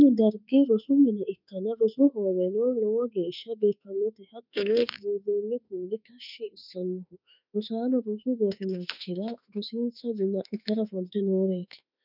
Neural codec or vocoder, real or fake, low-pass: autoencoder, 48 kHz, 32 numbers a frame, DAC-VAE, trained on Japanese speech; fake; 5.4 kHz